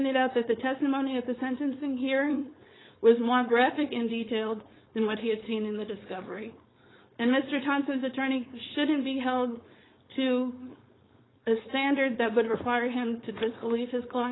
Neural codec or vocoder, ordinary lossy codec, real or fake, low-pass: codec, 16 kHz, 4.8 kbps, FACodec; AAC, 16 kbps; fake; 7.2 kHz